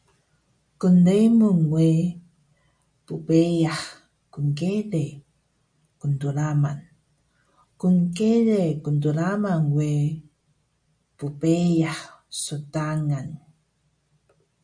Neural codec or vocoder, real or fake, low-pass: none; real; 9.9 kHz